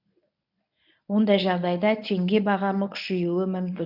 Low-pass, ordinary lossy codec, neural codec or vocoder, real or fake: 5.4 kHz; none; codec, 24 kHz, 0.9 kbps, WavTokenizer, medium speech release version 1; fake